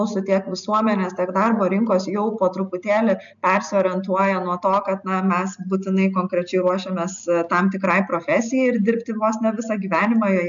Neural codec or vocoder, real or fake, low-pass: none; real; 7.2 kHz